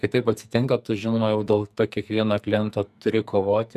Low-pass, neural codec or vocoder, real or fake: 14.4 kHz; codec, 44.1 kHz, 2.6 kbps, SNAC; fake